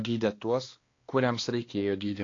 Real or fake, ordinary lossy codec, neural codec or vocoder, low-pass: fake; AAC, 32 kbps; codec, 16 kHz, 2 kbps, X-Codec, HuBERT features, trained on balanced general audio; 7.2 kHz